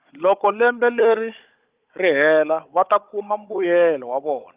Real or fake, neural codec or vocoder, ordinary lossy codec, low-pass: fake; codec, 16 kHz, 16 kbps, FunCodec, trained on Chinese and English, 50 frames a second; Opus, 24 kbps; 3.6 kHz